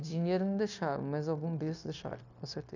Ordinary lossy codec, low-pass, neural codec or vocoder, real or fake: none; 7.2 kHz; codec, 16 kHz, 0.9 kbps, LongCat-Audio-Codec; fake